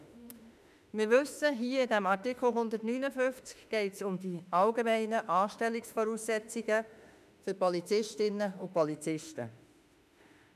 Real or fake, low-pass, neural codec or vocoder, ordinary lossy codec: fake; 14.4 kHz; autoencoder, 48 kHz, 32 numbers a frame, DAC-VAE, trained on Japanese speech; none